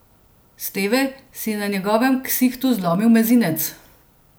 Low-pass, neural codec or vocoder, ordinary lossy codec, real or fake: none; none; none; real